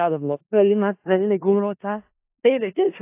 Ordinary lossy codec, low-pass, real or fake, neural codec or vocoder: AAC, 24 kbps; 3.6 kHz; fake; codec, 16 kHz in and 24 kHz out, 0.4 kbps, LongCat-Audio-Codec, four codebook decoder